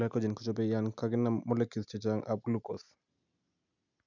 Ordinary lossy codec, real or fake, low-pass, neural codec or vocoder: none; real; 7.2 kHz; none